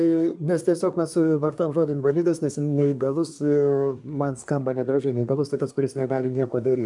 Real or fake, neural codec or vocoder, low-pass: fake; codec, 24 kHz, 1 kbps, SNAC; 10.8 kHz